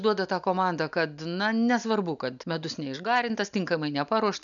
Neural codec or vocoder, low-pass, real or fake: none; 7.2 kHz; real